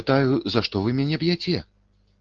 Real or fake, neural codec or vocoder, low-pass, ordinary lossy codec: real; none; 7.2 kHz; Opus, 16 kbps